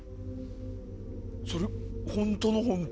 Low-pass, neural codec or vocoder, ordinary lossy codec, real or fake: none; none; none; real